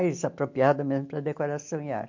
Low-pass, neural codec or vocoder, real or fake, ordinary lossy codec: 7.2 kHz; none; real; MP3, 48 kbps